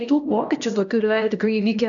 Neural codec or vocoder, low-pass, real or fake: codec, 16 kHz, 1 kbps, X-Codec, HuBERT features, trained on LibriSpeech; 7.2 kHz; fake